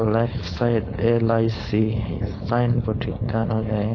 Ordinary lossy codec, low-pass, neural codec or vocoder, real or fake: AAC, 32 kbps; 7.2 kHz; codec, 16 kHz, 4.8 kbps, FACodec; fake